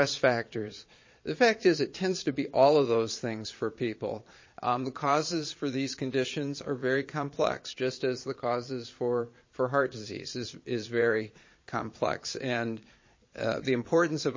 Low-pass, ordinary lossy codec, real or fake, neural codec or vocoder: 7.2 kHz; MP3, 32 kbps; fake; vocoder, 22.05 kHz, 80 mel bands, Vocos